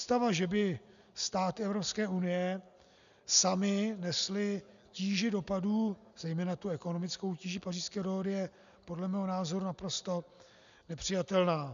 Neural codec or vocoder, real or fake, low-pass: none; real; 7.2 kHz